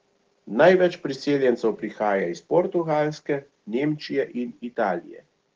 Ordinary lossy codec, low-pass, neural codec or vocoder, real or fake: Opus, 16 kbps; 7.2 kHz; none; real